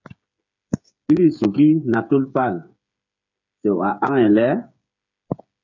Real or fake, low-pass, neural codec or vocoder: fake; 7.2 kHz; codec, 16 kHz, 8 kbps, FreqCodec, smaller model